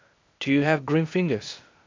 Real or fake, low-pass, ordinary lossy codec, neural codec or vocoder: fake; 7.2 kHz; MP3, 64 kbps; codec, 16 kHz, 0.8 kbps, ZipCodec